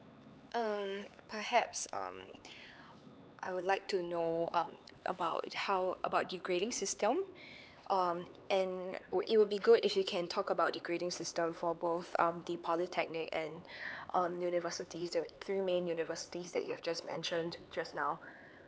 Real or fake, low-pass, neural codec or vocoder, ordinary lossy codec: fake; none; codec, 16 kHz, 4 kbps, X-Codec, HuBERT features, trained on LibriSpeech; none